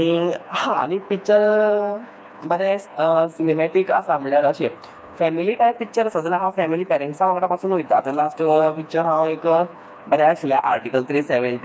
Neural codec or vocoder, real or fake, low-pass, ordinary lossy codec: codec, 16 kHz, 2 kbps, FreqCodec, smaller model; fake; none; none